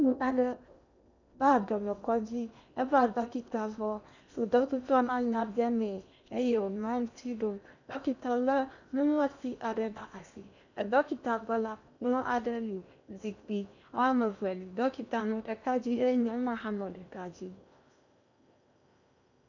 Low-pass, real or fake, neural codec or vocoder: 7.2 kHz; fake; codec, 16 kHz in and 24 kHz out, 0.8 kbps, FocalCodec, streaming, 65536 codes